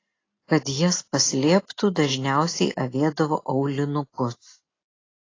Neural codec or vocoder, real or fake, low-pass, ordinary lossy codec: none; real; 7.2 kHz; AAC, 32 kbps